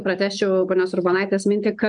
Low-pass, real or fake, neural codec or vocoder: 9.9 kHz; real; none